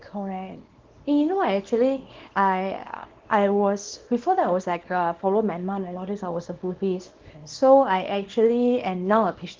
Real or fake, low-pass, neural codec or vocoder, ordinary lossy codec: fake; 7.2 kHz; codec, 24 kHz, 0.9 kbps, WavTokenizer, small release; Opus, 16 kbps